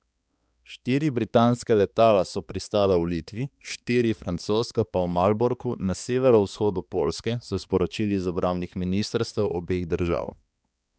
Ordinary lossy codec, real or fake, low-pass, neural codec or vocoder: none; fake; none; codec, 16 kHz, 2 kbps, X-Codec, HuBERT features, trained on balanced general audio